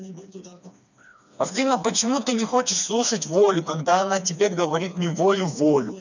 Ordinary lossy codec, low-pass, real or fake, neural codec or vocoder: none; 7.2 kHz; fake; codec, 16 kHz, 2 kbps, FreqCodec, smaller model